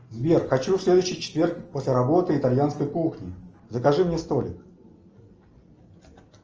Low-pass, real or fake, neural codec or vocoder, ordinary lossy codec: 7.2 kHz; real; none; Opus, 24 kbps